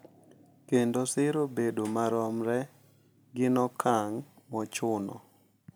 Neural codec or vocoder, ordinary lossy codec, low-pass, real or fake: none; none; none; real